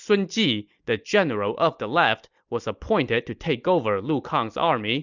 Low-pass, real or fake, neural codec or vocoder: 7.2 kHz; real; none